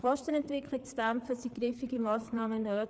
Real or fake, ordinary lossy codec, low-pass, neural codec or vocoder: fake; none; none; codec, 16 kHz, 4 kbps, FreqCodec, larger model